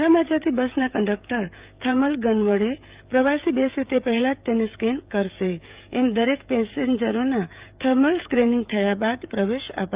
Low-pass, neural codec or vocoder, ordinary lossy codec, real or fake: 3.6 kHz; codec, 16 kHz, 16 kbps, FreqCodec, smaller model; Opus, 24 kbps; fake